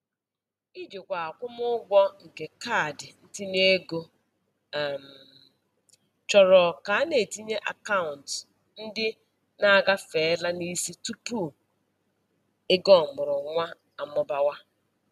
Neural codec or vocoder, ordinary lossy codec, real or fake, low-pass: none; none; real; 14.4 kHz